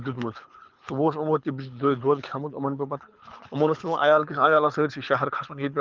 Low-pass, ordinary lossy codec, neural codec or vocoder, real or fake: 7.2 kHz; Opus, 32 kbps; codec, 24 kHz, 6 kbps, HILCodec; fake